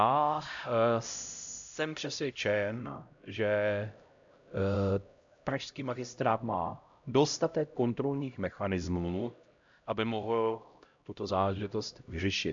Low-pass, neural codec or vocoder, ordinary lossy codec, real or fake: 7.2 kHz; codec, 16 kHz, 0.5 kbps, X-Codec, HuBERT features, trained on LibriSpeech; AAC, 64 kbps; fake